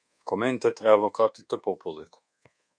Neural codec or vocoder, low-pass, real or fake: codec, 24 kHz, 1.2 kbps, DualCodec; 9.9 kHz; fake